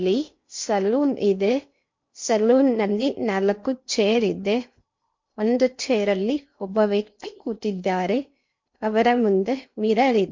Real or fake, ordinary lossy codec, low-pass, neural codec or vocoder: fake; MP3, 48 kbps; 7.2 kHz; codec, 16 kHz in and 24 kHz out, 0.8 kbps, FocalCodec, streaming, 65536 codes